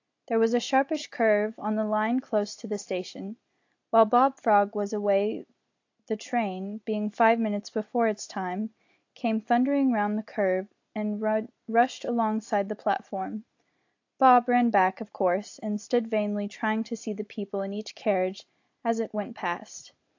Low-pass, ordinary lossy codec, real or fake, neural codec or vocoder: 7.2 kHz; AAC, 48 kbps; real; none